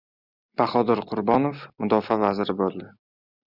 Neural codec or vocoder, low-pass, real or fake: none; 5.4 kHz; real